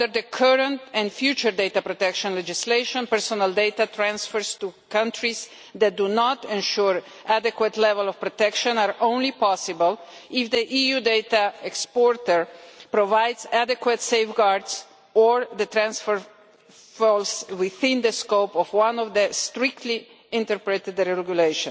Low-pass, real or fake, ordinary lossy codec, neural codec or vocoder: none; real; none; none